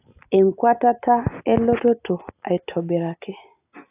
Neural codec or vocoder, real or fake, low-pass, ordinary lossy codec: none; real; 3.6 kHz; AAC, 32 kbps